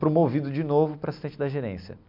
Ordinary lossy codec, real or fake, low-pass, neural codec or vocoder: MP3, 48 kbps; real; 5.4 kHz; none